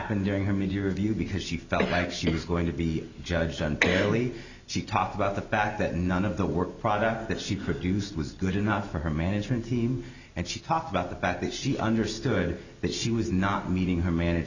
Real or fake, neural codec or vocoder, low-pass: real; none; 7.2 kHz